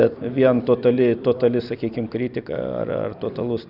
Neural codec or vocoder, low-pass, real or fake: none; 5.4 kHz; real